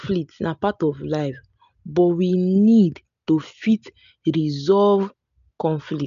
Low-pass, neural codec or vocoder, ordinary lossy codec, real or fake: 7.2 kHz; none; none; real